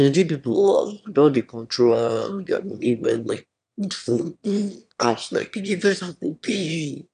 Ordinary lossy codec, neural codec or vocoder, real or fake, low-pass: none; autoencoder, 22.05 kHz, a latent of 192 numbers a frame, VITS, trained on one speaker; fake; 9.9 kHz